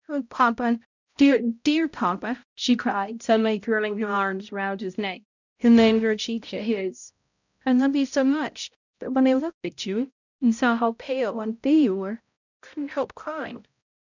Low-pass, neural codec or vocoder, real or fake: 7.2 kHz; codec, 16 kHz, 0.5 kbps, X-Codec, HuBERT features, trained on balanced general audio; fake